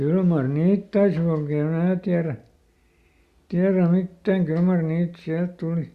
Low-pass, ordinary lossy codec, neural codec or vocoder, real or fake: 14.4 kHz; Opus, 64 kbps; none; real